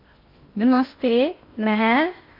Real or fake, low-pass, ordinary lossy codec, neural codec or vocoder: fake; 5.4 kHz; MP3, 32 kbps; codec, 16 kHz in and 24 kHz out, 0.6 kbps, FocalCodec, streaming, 2048 codes